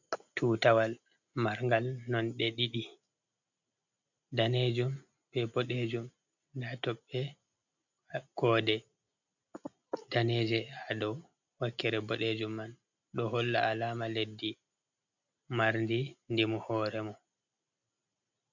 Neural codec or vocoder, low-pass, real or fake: none; 7.2 kHz; real